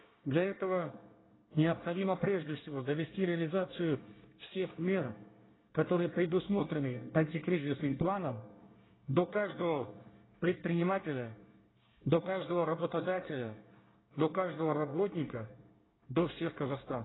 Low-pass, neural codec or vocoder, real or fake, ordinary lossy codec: 7.2 kHz; codec, 24 kHz, 1 kbps, SNAC; fake; AAC, 16 kbps